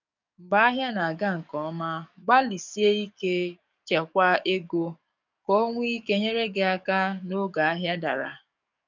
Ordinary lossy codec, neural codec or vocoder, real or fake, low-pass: none; codec, 44.1 kHz, 7.8 kbps, DAC; fake; 7.2 kHz